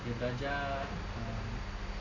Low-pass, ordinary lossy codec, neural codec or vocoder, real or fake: 7.2 kHz; none; none; real